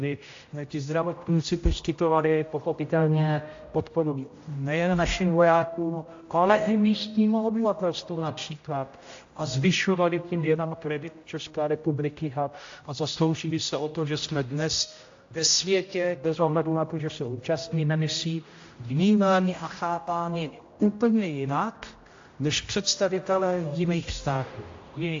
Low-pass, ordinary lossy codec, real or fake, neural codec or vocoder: 7.2 kHz; AAC, 48 kbps; fake; codec, 16 kHz, 0.5 kbps, X-Codec, HuBERT features, trained on general audio